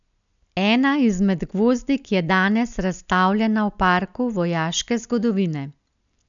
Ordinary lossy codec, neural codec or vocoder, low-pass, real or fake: none; none; 7.2 kHz; real